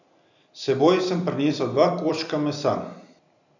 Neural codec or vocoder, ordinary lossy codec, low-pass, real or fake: vocoder, 44.1 kHz, 128 mel bands every 256 samples, BigVGAN v2; none; 7.2 kHz; fake